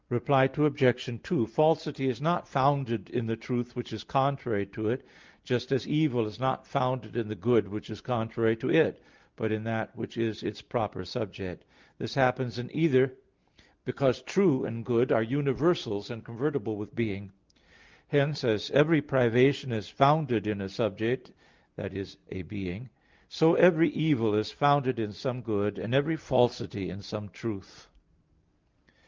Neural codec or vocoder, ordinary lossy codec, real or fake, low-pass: none; Opus, 32 kbps; real; 7.2 kHz